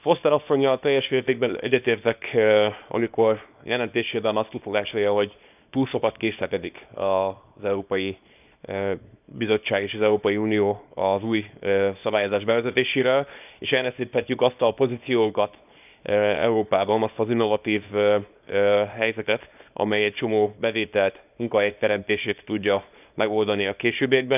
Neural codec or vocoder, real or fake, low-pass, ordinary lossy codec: codec, 24 kHz, 0.9 kbps, WavTokenizer, small release; fake; 3.6 kHz; none